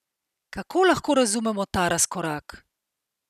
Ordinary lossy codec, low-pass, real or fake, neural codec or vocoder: none; 14.4 kHz; real; none